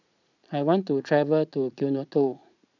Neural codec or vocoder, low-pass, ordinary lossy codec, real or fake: vocoder, 44.1 kHz, 80 mel bands, Vocos; 7.2 kHz; none; fake